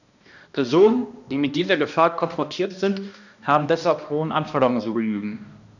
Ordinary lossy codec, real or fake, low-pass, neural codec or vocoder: none; fake; 7.2 kHz; codec, 16 kHz, 1 kbps, X-Codec, HuBERT features, trained on balanced general audio